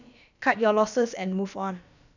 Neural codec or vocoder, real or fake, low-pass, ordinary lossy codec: codec, 16 kHz, about 1 kbps, DyCAST, with the encoder's durations; fake; 7.2 kHz; none